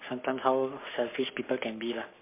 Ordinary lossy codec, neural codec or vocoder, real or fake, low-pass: MP3, 24 kbps; codec, 44.1 kHz, 7.8 kbps, Pupu-Codec; fake; 3.6 kHz